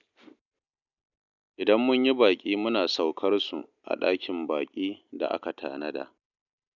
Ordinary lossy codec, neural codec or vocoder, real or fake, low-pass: none; none; real; 7.2 kHz